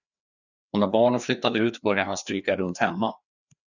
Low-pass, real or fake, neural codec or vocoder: 7.2 kHz; fake; codec, 16 kHz, 2 kbps, FreqCodec, larger model